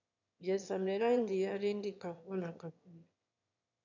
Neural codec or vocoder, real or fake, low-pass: autoencoder, 22.05 kHz, a latent of 192 numbers a frame, VITS, trained on one speaker; fake; 7.2 kHz